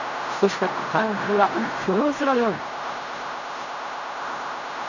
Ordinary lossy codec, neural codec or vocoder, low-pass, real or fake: none; codec, 16 kHz in and 24 kHz out, 0.4 kbps, LongCat-Audio-Codec, fine tuned four codebook decoder; 7.2 kHz; fake